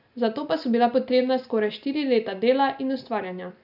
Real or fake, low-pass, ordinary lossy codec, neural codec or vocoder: real; 5.4 kHz; none; none